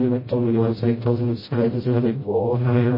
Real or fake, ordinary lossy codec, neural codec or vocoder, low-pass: fake; MP3, 24 kbps; codec, 16 kHz, 0.5 kbps, FreqCodec, smaller model; 5.4 kHz